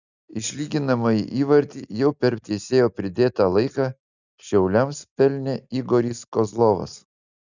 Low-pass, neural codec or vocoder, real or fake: 7.2 kHz; none; real